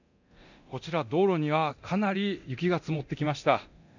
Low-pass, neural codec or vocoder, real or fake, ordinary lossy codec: 7.2 kHz; codec, 24 kHz, 0.9 kbps, DualCodec; fake; none